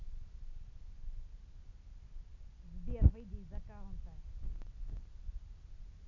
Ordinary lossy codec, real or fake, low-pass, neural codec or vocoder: none; real; 7.2 kHz; none